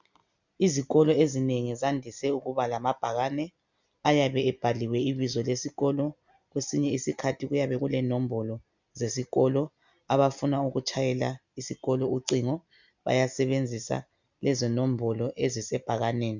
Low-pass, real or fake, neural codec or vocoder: 7.2 kHz; real; none